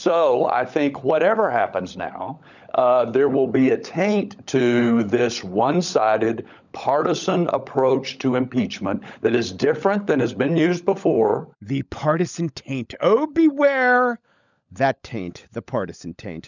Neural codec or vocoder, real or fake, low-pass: codec, 16 kHz, 16 kbps, FunCodec, trained on LibriTTS, 50 frames a second; fake; 7.2 kHz